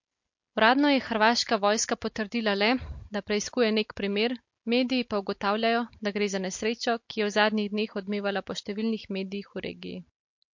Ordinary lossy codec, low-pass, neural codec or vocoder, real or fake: MP3, 48 kbps; 7.2 kHz; none; real